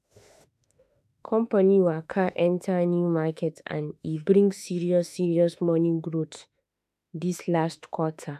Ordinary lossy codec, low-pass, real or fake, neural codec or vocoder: none; 14.4 kHz; fake; autoencoder, 48 kHz, 32 numbers a frame, DAC-VAE, trained on Japanese speech